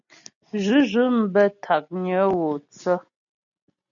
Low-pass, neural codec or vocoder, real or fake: 7.2 kHz; none; real